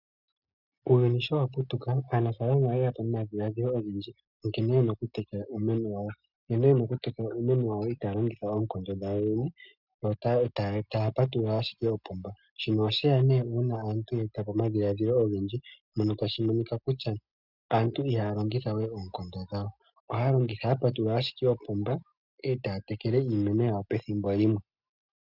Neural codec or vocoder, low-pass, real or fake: none; 5.4 kHz; real